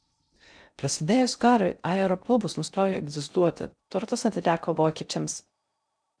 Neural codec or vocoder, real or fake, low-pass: codec, 16 kHz in and 24 kHz out, 0.6 kbps, FocalCodec, streaming, 2048 codes; fake; 9.9 kHz